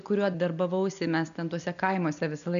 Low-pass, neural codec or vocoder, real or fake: 7.2 kHz; none; real